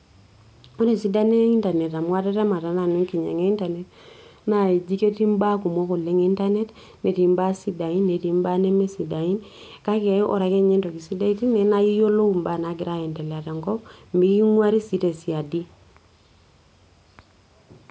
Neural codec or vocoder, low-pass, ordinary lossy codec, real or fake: none; none; none; real